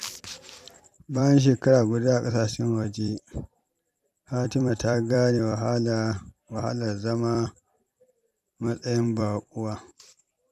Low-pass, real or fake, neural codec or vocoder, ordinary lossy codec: 14.4 kHz; fake; vocoder, 44.1 kHz, 128 mel bands every 256 samples, BigVGAN v2; none